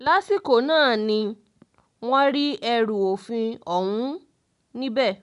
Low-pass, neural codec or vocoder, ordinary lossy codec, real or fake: 10.8 kHz; none; none; real